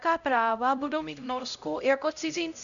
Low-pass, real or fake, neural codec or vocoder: 7.2 kHz; fake; codec, 16 kHz, 0.5 kbps, X-Codec, HuBERT features, trained on LibriSpeech